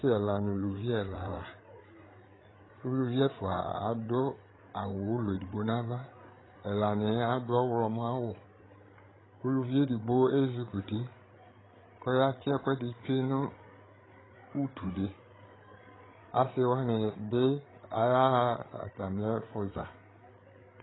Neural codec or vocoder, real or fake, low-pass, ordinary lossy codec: codec, 16 kHz, 8 kbps, FreqCodec, larger model; fake; 7.2 kHz; AAC, 16 kbps